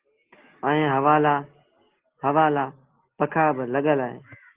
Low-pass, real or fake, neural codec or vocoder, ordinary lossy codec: 3.6 kHz; real; none; Opus, 16 kbps